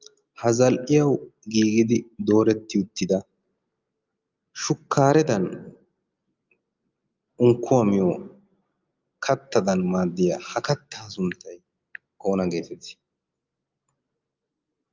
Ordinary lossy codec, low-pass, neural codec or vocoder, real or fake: Opus, 24 kbps; 7.2 kHz; none; real